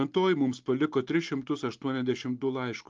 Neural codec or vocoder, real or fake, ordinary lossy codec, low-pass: none; real; Opus, 32 kbps; 7.2 kHz